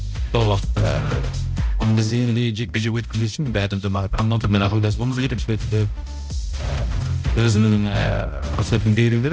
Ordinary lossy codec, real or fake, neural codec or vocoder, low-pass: none; fake; codec, 16 kHz, 0.5 kbps, X-Codec, HuBERT features, trained on balanced general audio; none